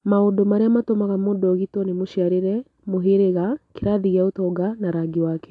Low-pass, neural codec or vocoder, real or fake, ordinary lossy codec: 10.8 kHz; none; real; AAC, 64 kbps